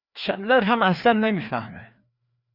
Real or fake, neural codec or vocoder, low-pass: fake; codec, 16 kHz, 2 kbps, FreqCodec, larger model; 5.4 kHz